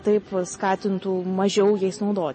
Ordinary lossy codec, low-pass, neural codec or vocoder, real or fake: MP3, 32 kbps; 10.8 kHz; none; real